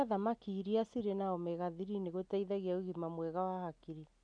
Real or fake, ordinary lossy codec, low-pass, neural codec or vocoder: real; none; 9.9 kHz; none